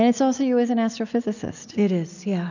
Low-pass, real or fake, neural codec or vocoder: 7.2 kHz; real; none